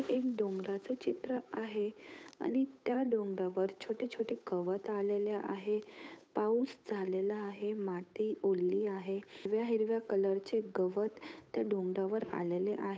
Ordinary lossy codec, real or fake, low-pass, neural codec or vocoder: none; fake; none; codec, 16 kHz, 8 kbps, FunCodec, trained on Chinese and English, 25 frames a second